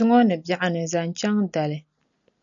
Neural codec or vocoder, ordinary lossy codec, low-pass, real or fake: none; MP3, 96 kbps; 7.2 kHz; real